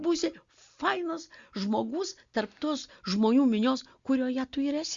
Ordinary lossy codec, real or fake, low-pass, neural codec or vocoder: Opus, 64 kbps; real; 7.2 kHz; none